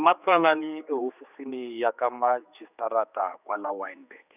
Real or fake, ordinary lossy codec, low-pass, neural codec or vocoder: fake; none; 3.6 kHz; codec, 16 kHz, 4 kbps, X-Codec, HuBERT features, trained on general audio